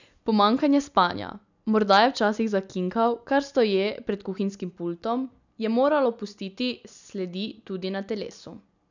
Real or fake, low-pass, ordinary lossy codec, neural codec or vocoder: real; 7.2 kHz; none; none